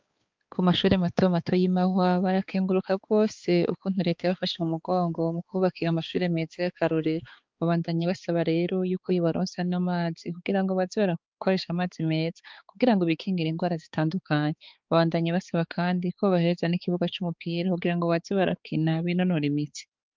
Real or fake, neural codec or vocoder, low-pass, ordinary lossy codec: fake; codec, 16 kHz, 4 kbps, X-Codec, HuBERT features, trained on balanced general audio; 7.2 kHz; Opus, 24 kbps